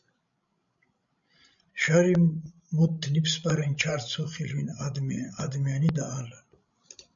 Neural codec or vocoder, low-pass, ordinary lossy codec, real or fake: codec, 16 kHz, 16 kbps, FreqCodec, larger model; 7.2 kHz; MP3, 64 kbps; fake